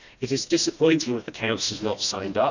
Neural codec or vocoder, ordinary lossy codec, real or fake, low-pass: codec, 16 kHz, 1 kbps, FreqCodec, smaller model; none; fake; 7.2 kHz